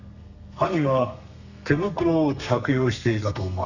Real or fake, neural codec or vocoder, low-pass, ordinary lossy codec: fake; codec, 32 kHz, 1.9 kbps, SNAC; 7.2 kHz; none